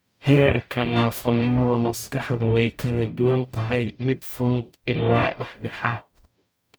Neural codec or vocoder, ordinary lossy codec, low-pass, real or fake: codec, 44.1 kHz, 0.9 kbps, DAC; none; none; fake